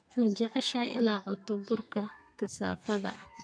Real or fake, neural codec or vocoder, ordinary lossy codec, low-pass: fake; codec, 32 kHz, 1.9 kbps, SNAC; none; 9.9 kHz